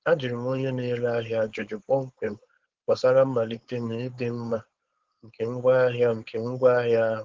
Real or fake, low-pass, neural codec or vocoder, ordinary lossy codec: fake; 7.2 kHz; codec, 16 kHz, 4.8 kbps, FACodec; Opus, 16 kbps